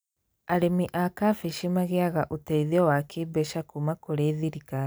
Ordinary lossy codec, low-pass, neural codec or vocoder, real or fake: none; none; none; real